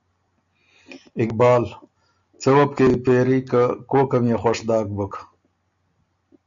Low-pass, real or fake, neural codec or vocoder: 7.2 kHz; real; none